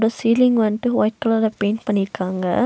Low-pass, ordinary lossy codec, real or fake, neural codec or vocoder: none; none; real; none